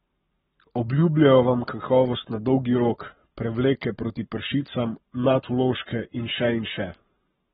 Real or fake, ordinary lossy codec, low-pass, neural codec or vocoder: fake; AAC, 16 kbps; 19.8 kHz; codec, 44.1 kHz, 7.8 kbps, Pupu-Codec